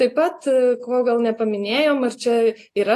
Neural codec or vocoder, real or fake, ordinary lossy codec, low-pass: none; real; AAC, 48 kbps; 14.4 kHz